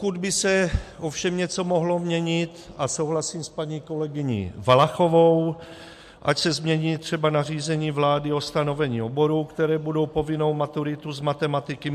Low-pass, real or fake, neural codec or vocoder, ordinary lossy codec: 14.4 kHz; real; none; MP3, 64 kbps